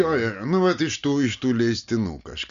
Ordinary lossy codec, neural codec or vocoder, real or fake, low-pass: Opus, 64 kbps; none; real; 7.2 kHz